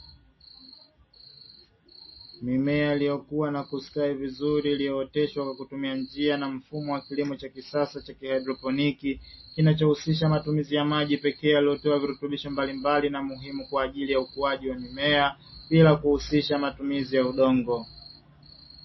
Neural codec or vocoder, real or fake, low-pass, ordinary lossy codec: none; real; 7.2 kHz; MP3, 24 kbps